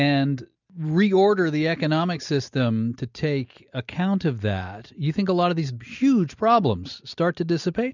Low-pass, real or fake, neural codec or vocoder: 7.2 kHz; real; none